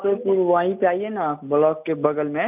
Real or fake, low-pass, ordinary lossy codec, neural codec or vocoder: real; 3.6 kHz; none; none